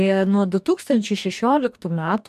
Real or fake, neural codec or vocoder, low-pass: fake; codec, 44.1 kHz, 2.6 kbps, DAC; 14.4 kHz